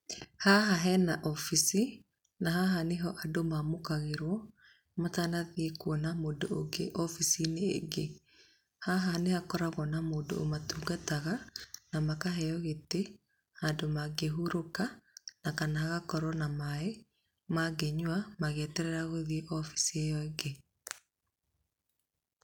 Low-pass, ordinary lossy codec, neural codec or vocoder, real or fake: 19.8 kHz; none; none; real